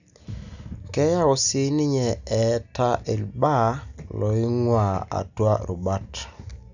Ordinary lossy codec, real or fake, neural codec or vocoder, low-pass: none; real; none; 7.2 kHz